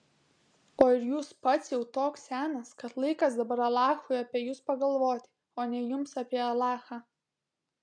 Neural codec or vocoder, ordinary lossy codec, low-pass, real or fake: none; MP3, 96 kbps; 9.9 kHz; real